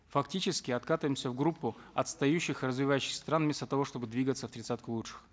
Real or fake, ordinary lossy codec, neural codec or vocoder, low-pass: real; none; none; none